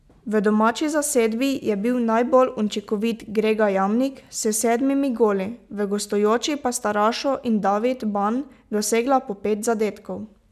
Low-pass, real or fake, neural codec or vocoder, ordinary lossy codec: 14.4 kHz; real; none; none